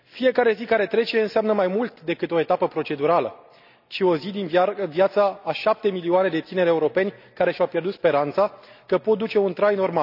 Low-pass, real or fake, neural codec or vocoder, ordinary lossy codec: 5.4 kHz; real; none; none